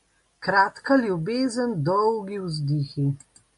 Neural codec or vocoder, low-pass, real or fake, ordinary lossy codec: none; 10.8 kHz; real; MP3, 64 kbps